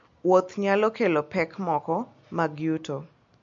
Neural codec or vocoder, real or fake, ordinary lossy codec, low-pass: none; real; MP3, 48 kbps; 7.2 kHz